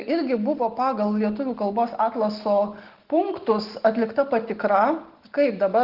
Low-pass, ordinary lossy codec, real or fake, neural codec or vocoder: 5.4 kHz; Opus, 16 kbps; real; none